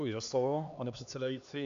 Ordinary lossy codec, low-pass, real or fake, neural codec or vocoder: MP3, 64 kbps; 7.2 kHz; fake; codec, 16 kHz, 2 kbps, X-Codec, HuBERT features, trained on LibriSpeech